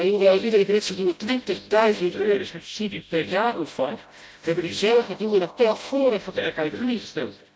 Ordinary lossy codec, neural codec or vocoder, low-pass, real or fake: none; codec, 16 kHz, 0.5 kbps, FreqCodec, smaller model; none; fake